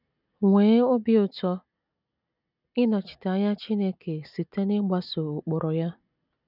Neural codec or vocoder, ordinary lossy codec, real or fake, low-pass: none; none; real; 5.4 kHz